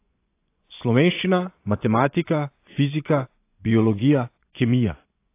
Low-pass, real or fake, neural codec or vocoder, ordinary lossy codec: 3.6 kHz; fake; vocoder, 24 kHz, 100 mel bands, Vocos; AAC, 24 kbps